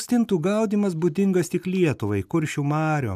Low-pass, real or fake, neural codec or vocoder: 14.4 kHz; real; none